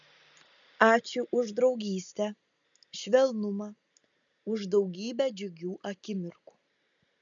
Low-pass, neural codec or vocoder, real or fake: 7.2 kHz; none; real